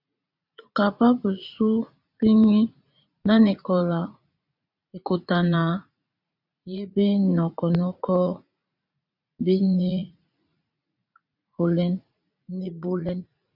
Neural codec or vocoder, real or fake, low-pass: vocoder, 44.1 kHz, 80 mel bands, Vocos; fake; 5.4 kHz